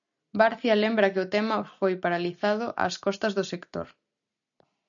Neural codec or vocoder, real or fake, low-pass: none; real; 7.2 kHz